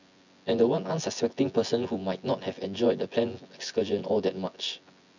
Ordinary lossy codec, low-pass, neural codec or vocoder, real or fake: none; 7.2 kHz; vocoder, 24 kHz, 100 mel bands, Vocos; fake